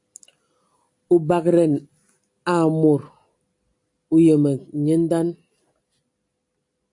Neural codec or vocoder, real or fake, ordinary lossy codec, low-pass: none; real; AAC, 64 kbps; 10.8 kHz